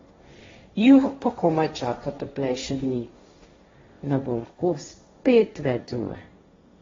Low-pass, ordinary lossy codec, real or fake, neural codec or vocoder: 7.2 kHz; AAC, 24 kbps; fake; codec, 16 kHz, 1.1 kbps, Voila-Tokenizer